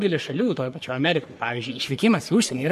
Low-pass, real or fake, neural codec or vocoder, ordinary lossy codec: 14.4 kHz; fake; codec, 44.1 kHz, 3.4 kbps, Pupu-Codec; MP3, 64 kbps